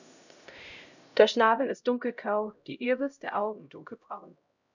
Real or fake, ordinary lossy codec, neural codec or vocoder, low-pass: fake; none; codec, 16 kHz, 0.5 kbps, X-Codec, HuBERT features, trained on LibriSpeech; 7.2 kHz